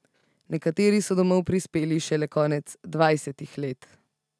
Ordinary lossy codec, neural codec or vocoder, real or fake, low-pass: none; none; real; none